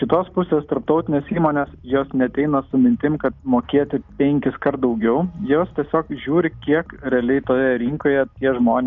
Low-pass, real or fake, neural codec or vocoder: 7.2 kHz; real; none